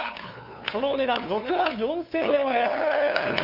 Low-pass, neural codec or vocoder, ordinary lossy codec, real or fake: 5.4 kHz; codec, 16 kHz, 2 kbps, FunCodec, trained on LibriTTS, 25 frames a second; none; fake